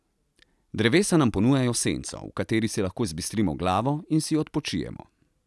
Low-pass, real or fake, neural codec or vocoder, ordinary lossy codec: none; real; none; none